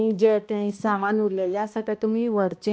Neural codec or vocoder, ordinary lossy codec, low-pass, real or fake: codec, 16 kHz, 1 kbps, X-Codec, HuBERT features, trained on balanced general audio; none; none; fake